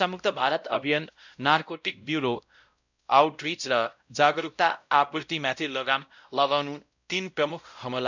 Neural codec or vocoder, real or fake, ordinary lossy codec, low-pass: codec, 16 kHz, 0.5 kbps, X-Codec, WavLM features, trained on Multilingual LibriSpeech; fake; none; 7.2 kHz